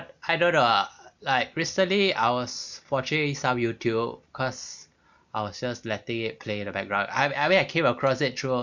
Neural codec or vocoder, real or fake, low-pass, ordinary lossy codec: none; real; 7.2 kHz; none